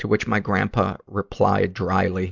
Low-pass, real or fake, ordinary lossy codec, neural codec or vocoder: 7.2 kHz; real; Opus, 64 kbps; none